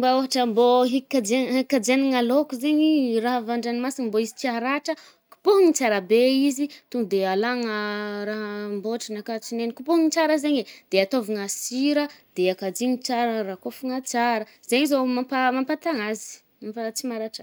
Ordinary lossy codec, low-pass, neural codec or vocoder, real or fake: none; none; none; real